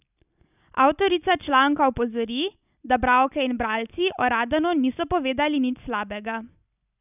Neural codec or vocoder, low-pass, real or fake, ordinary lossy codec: none; 3.6 kHz; real; none